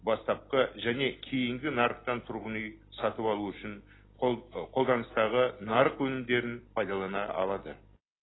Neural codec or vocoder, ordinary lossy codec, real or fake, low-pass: none; AAC, 16 kbps; real; 7.2 kHz